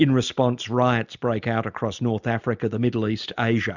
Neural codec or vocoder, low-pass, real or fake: none; 7.2 kHz; real